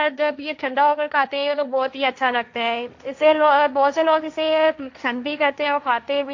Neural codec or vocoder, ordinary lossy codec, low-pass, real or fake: codec, 16 kHz, 1.1 kbps, Voila-Tokenizer; AAC, 48 kbps; 7.2 kHz; fake